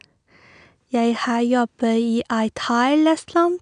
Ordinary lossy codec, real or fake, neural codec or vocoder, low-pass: none; real; none; 9.9 kHz